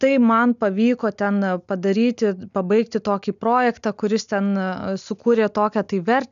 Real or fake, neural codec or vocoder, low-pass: real; none; 7.2 kHz